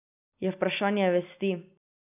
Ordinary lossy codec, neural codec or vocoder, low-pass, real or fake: none; none; 3.6 kHz; real